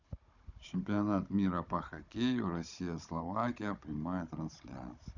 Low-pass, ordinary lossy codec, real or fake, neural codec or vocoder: 7.2 kHz; none; fake; codec, 16 kHz, 16 kbps, FunCodec, trained on Chinese and English, 50 frames a second